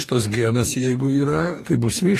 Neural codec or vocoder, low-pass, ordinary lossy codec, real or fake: codec, 44.1 kHz, 2.6 kbps, DAC; 14.4 kHz; AAC, 48 kbps; fake